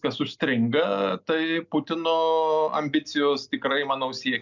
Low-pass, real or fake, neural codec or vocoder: 7.2 kHz; real; none